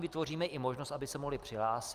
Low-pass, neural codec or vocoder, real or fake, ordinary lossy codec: 14.4 kHz; none; real; Opus, 32 kbps